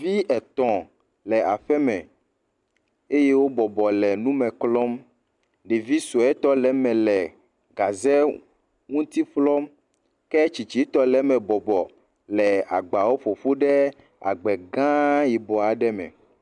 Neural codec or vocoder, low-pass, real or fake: none; 10.8 kHz; real